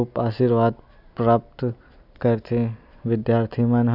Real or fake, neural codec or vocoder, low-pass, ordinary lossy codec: real; none; 5.4 kHz; none